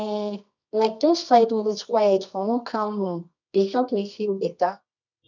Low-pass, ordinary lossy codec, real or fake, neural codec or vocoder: 7.2 kHz; none; fake; codec, 24 kHz, 0.9 kbps, WavTokenizer, medium music audio release